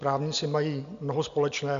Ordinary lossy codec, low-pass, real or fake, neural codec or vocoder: MP3, 64 kbps; 7.2 kHz; real; none